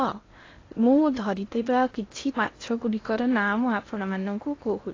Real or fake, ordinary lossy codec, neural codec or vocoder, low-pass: fake; AAC, 32 kbps; codec, 16 kHz in and 24 kHz out, 0.8 kbps, FocalCodec, streaming, 65536 codes; 7.2 kHz